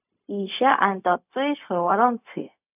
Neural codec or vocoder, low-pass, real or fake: codec, 16 kHz, 0.4 kbps, LongCat-Audio-Codec; 3.6 kHz; fake